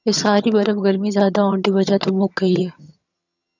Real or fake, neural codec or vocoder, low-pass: fake; vocoder, 22.05 kHz, 80 mel bands, HiFi-GAN; 7.2 kHz